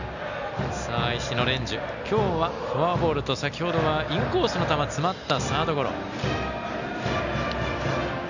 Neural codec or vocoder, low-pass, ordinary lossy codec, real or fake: none; 7.2 kHz; none; real